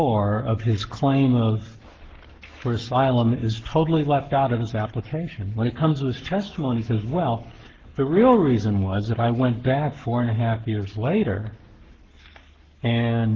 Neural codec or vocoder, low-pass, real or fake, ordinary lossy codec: codec, 44.1 kHz, 7.8 kbps, Pupu-Codec; 7.2 kHz; fake; Opus, 16 kbps